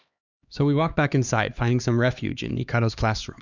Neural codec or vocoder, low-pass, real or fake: codec, 16 kHz, 2 kbps, X-Codec, HuBERT features, trained on LibriSpeech; 7.2 kHz; fake